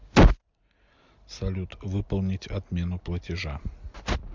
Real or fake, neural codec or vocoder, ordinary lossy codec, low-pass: real; none; MP3, 64 kbps; 7.2 kHz